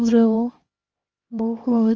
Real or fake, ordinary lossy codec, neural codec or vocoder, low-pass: fake; Opus, 24 kbps; codec, 16 kHz, 1 kbps, X-Codec, HuBERT features, trained on LibriSpeech; 7.2 kHz